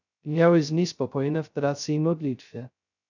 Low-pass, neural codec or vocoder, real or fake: 7.2 kHz; codec, 16 kHz, 0.2 kbps, FocalCodec; fake